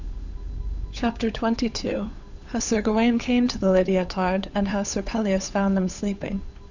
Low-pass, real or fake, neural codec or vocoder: 7.2 kHz; fake; codec, 16 kHz, 8 kbps, FunCodec, trained on Chinese and English, 25 frames a second